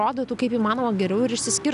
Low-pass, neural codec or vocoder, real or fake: 10.8 kHz; none; real